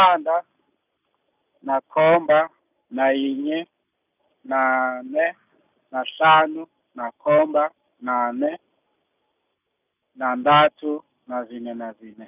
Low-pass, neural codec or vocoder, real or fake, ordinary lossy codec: 3.6 kHz; none; real; none